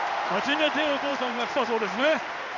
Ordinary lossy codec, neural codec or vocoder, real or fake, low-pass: none; codec, 16 kHz in and 24 kHz out, 1 kbps, XY-Tokenizer; fake; 7.2 kHz